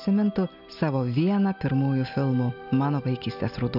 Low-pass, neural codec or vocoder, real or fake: 5.4 kHz; none; real